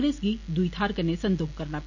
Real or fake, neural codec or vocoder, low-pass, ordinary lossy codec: real; none; 7.2 kHz; none